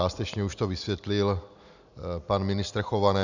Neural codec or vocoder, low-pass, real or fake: none; 7.2 kHz; real